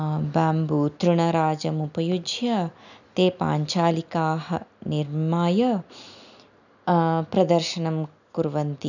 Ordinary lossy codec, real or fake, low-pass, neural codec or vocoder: none; real; 7.2 kHz; none